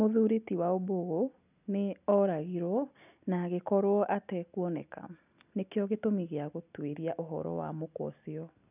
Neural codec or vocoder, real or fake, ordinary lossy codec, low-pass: none; real; none; 3.6 kHz